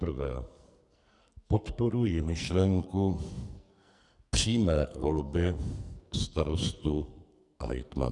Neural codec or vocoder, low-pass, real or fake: codec, 44.1 kHz, 2.6 kbps, SNAC; 10.8 kHz; fake